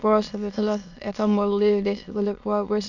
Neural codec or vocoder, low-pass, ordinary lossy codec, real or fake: autoencoder, 22.05 kHz, a latent of 192 numbers a frame, VITS, trained on many speakers; 7.2 kHz; none; fake